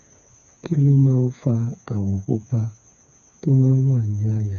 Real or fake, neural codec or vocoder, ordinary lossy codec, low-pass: fake; codec, 16 kHz, 4 kbps, FreqCodec, smaller model; none; 7.2 kHz